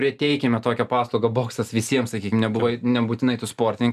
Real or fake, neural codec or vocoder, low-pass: real; none; 14.4 kHz